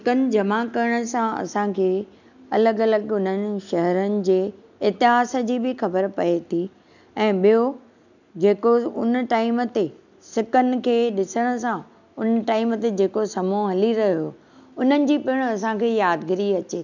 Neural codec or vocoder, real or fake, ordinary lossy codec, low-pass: none; real; none; 7.2 kHz